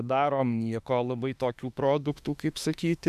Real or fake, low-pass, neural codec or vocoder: fake; 14.4 kHz; autoencoder, 48 kHz, 32 numbers a frame, DAC-VAE, trained on Japanese speech